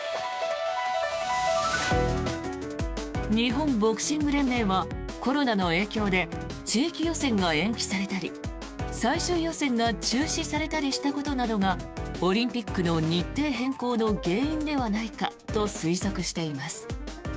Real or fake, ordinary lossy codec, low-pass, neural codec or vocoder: fake; none; none; codec, 16 kHz, 6 kbps, DAC